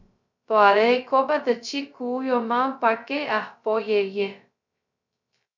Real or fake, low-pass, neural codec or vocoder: fake; 7.2 kHz; codec, 16 kHz, 0.2 kbps, FocalCodec